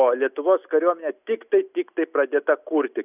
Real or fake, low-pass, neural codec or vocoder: real; 3.6 kHz; none